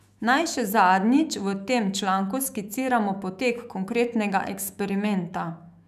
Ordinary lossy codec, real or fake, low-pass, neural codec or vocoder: none; fake; 14.4 kHz; autoencoder, 48 kHz, 128 numbers a frame, DAC-VAE, trained on Japanese speech